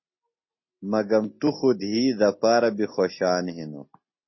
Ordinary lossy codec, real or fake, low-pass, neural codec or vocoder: MP3, 24 kbps; real; 7.2 kHz; none